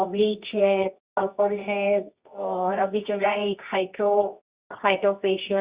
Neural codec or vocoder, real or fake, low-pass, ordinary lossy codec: codec, 24 kHz, 0.9 kbps, WavTokenizer, medium music audio release; fake; 3.6 kHz; Opus, 64 kbps